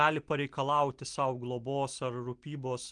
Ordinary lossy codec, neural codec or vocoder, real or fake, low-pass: Opus, 24 kbps; none; real; 9.9 kHz